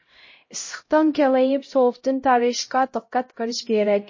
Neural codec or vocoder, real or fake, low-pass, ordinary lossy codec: codec, 16 kHz, 0.5 kbps, X-Codec, HuBERT features, trained on LibriSpeech; fake; 7.2 kHz; MP3, 32 kbps